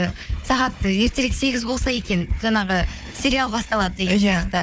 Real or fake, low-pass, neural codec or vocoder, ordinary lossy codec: fake; none; codec, 16 kHz, 4 kbps, FunCodec, trained on Chinese and English, 50 frames a second; none